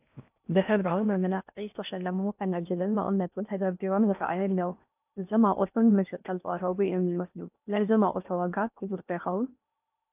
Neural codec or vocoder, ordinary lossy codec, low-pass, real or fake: codec, 16 kHz in and 24 kHz out, 0.6 kbps, FocalCodec, streaming, 4096 codes; AAC, 32 kbps; 3.6 kHz; fake